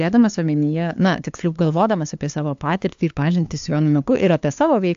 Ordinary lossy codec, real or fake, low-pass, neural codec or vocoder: AAC, 64 kbps; fake; 7.2 kHz; codec, 16 kHz, 2 kbps, FunCodec, trained on LibriTTS, 25 frames a second